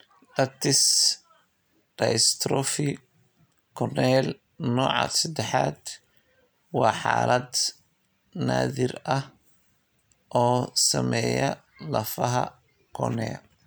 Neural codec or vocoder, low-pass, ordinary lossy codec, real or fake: none; none; none; real